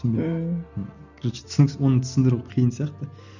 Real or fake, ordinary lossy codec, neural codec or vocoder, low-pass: real; none; none; 7.2 kHz